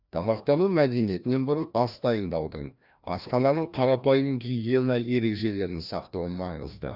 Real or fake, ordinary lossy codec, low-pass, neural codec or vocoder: fake; none; 5.4 kHz; codec, 16 kHz, 1 kbps, FreqCodec, larger model